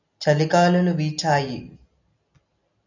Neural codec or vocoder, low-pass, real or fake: none; 7.2 kHz; real